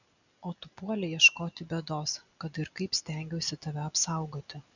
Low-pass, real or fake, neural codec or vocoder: 7.2 kHz; real; none